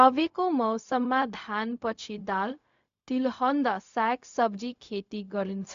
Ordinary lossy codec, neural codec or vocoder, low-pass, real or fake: AAC, 64 kbps; codec, 16 kHz, 0.4 kbps, LongCat-Audio-Codec; 7.2 kHz; fake